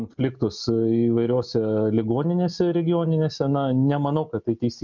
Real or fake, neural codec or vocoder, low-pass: real; none; 7.2 kHz